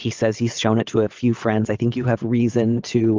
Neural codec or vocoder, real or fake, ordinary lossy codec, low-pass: vocoder, 22.05 kHz, 80 mel bands, WaveNeXt; fake; Opus, 24 kbps; 7.2 kHz